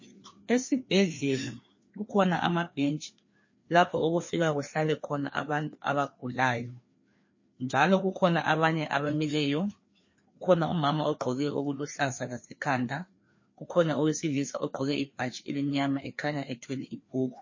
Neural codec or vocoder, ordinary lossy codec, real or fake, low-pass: codec, 16 kHz, 2 kbps, FreqCodec, larger model; MP3, 32 kbps; fake; 7.2 kHz